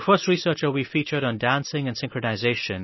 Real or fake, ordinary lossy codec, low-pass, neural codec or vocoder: real; MP3, 24 kbps; 7.2 kHz; none